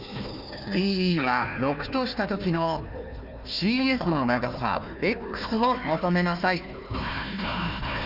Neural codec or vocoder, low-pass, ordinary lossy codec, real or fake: codec, 16 kHz, 1 kbps, FunCodec, trained on Chinese and English, 50 frames a second; 5.4 kHz; none; fake